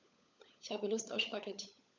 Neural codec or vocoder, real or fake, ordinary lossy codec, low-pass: codec, 16 kHz, 16 kbps, FunCodec, trained on LibriTTS, 50 frames a second; fake; none; none